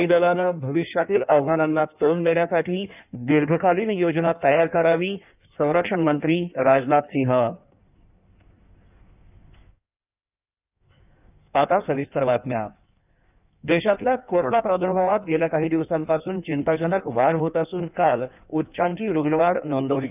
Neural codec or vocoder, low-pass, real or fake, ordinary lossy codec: codec, 16 kHz in and 24 kHz out, 1.1 kbps, FireRedTTS-2 codec; 3.6 kHz; fake; none